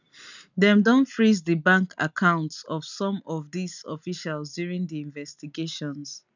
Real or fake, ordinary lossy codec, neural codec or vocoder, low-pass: real; none; none; 7.2 kHz